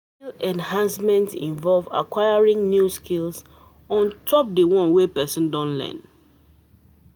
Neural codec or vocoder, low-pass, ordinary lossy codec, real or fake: none; none; none; real